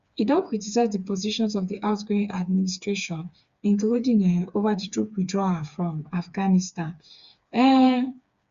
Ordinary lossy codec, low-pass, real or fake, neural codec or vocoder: Opus, 64 kbps; 7.2 kHz; fake; codec, 16 kHz, 4 kbps, FreqCodec, smaller model